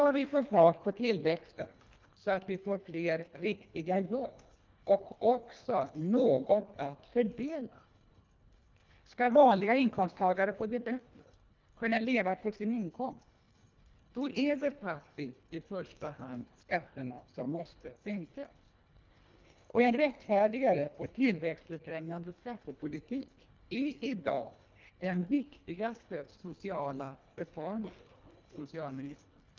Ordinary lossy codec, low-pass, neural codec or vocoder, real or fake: Opus, 24 kbps; 7.2 kHz; codec, 24 kHz, 1.5 kbps, HILCodec; fake